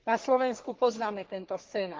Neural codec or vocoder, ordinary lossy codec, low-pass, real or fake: codec, 44.1 kHz, 3.4 kbps, Pupu-Codec; Opus, 16 kbps; 7.2 kHz; fake